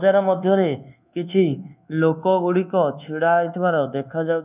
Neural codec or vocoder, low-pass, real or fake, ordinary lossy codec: codec, 24 kHz, 1.2 kbps, DualCodec; 3.6 kHz; fake; none